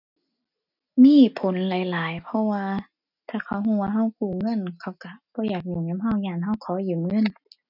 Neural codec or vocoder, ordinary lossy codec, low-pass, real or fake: none; none; 5.4 kHz; real